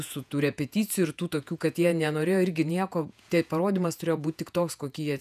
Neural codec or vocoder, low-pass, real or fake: vocoder, 48 kHz, 128 mel bands, Vocos; 14.4 kHz; fake